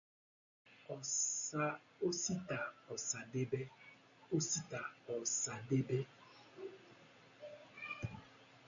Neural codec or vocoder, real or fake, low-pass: none; real; 7.2 kHz